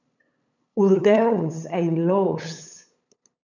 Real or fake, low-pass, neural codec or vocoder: fake; 7.2 kHz; codec, 16 kHz, 8 kbps, FunCodec, trained on LibriTTS, 25 frames a second